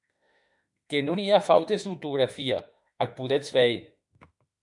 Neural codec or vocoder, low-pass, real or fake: autoencoder, 48 kHz, 32 numbers a frame, DAC-VAE, trained on Japanese speech; 10.8 kHz; fake